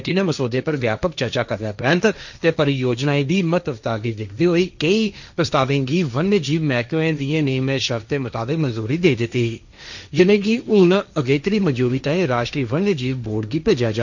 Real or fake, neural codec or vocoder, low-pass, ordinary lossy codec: fake; codec, 16 kHz, 1.1 kbps, Voila-Tokenizer; 7.2 kHz; none